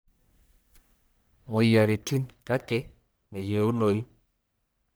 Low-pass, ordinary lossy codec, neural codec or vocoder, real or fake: none; none; codec, 44.1 kHz, 1.7 kbps, Pupu-Codec; fake